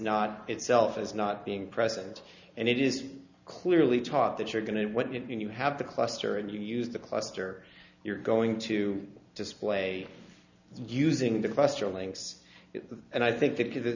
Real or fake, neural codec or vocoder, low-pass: real; none; 7.2 kHz